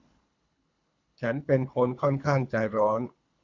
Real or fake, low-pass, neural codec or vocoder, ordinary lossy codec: fake; 7.2 kHz; codec, 24 kHz, 3 kbps, HILCodec; Opus, 64 kbps